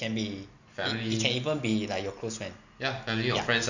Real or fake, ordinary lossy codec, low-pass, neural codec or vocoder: real; none; 7.2 kHz; none